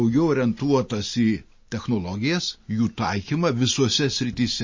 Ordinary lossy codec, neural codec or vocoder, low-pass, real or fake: MP3, 32 kbps; none; 7.2 kHz; real